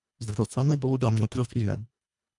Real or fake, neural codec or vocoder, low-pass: fake; codec, 24 kHz, 1.5 kbps, HILCodec; 10.8 kHz